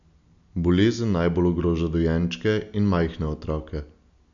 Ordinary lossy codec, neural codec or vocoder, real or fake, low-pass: none; none; real; 7.2 kHz